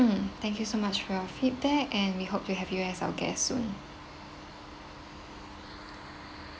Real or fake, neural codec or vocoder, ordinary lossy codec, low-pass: real; none; none; none